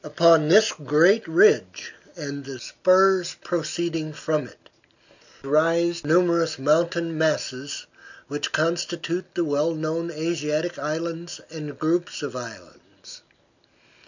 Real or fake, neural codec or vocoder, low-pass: real; none; 7.2 kHz